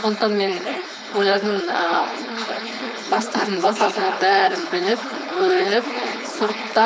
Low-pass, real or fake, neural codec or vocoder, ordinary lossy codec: none; fake; codec, 16 kHz, 4.8 kbps, FACodec; none